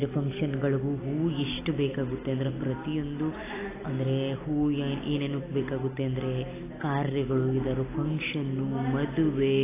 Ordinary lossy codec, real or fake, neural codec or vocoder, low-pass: AAC, 16 kbps; real; none; 3.6 kHz